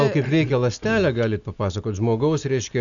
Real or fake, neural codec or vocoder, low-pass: real; none; 7.2 kHz